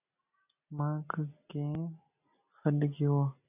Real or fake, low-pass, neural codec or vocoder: real; 3.6 kHz; none